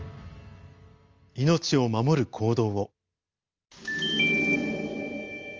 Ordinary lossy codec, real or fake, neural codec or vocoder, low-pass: Opus, 32 kbps; real; none; 7.2 kHz